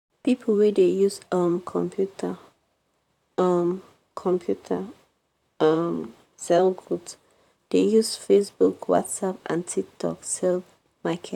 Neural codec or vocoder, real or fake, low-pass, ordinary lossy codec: vocoder, 44.1 kHz, 128 mel bands, Pupu-Vocoder; fake; 19.8 kHz; none